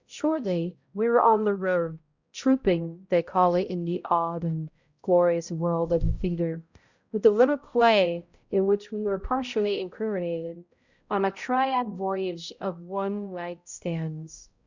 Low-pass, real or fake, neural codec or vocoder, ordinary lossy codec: 7.2 kHz; fake; codec, 16 kHz, 0.5 kbps, X-Codec, HuBERT features, trained on balanced general audio; Opus, 64 kbps